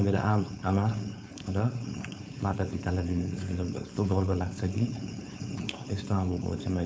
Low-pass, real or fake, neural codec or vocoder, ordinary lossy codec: none; fake; codec, 16 kHz, 4.8 kbps, FACodec; none